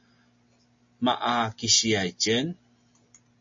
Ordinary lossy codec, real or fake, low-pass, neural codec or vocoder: MP3, 32 kbps; real; 7.2 kHz; none